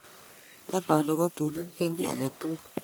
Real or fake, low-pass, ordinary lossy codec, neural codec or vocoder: fake; none; none; codec, 44.1 kHz, 1.7 kbps, Pupu-Codec